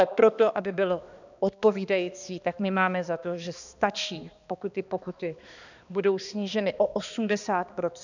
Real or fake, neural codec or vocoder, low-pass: fake; codec, 16 kHz, 2 kbps, X-Codec, HuBERT features, trained on balanced general audio; 7.2 kHz